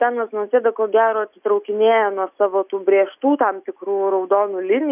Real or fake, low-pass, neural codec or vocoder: real; 3.6 kHz; none